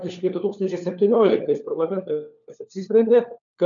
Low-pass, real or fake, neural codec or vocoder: 7.2 kHz; fake; codec, 16 kHz, 4 kbps, FunCodec, trained on LibriTTS, 50 frames a second